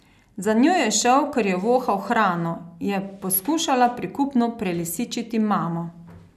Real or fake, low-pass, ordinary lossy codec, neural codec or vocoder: real; 14.4 kHz; none; none